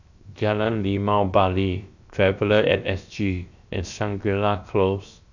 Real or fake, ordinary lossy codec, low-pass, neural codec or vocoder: fake; none; 7.2 kHz; codec, 16 kHz, 0.7 kbps, FocalCodec